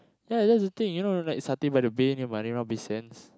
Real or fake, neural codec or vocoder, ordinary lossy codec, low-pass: real; none; none; none